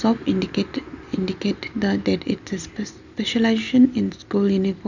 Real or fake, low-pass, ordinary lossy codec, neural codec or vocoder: real; 7.2 kHz; none; none